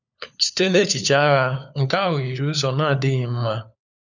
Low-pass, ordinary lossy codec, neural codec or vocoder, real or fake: 7.2 kHz; none; codec, 16 kHz, 4 kbps, FunCodec, trained on LibriTTS, 50 frames a second; fake